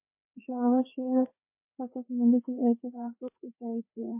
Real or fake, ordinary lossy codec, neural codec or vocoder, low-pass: fake; MP3, 24 kbps; codec, 16 kHz, 2 kbps, FreqCodec, larger model; 3.6 kHz